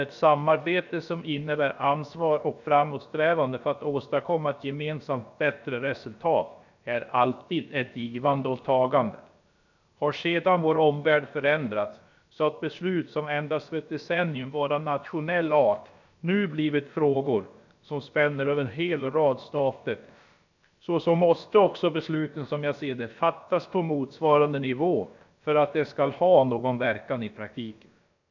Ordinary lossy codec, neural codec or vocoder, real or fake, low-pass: none; codec, 16 kHz, about 1 kbps, DyCAST, with the encoder's durations; fake; 7.2 kHz